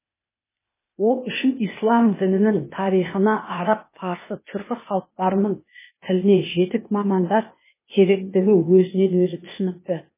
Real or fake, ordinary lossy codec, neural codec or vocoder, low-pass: fake; MP3, 16 kbps; codec, 16 kHz, 0.8 kbps, ZipCodec; 3.6 kHz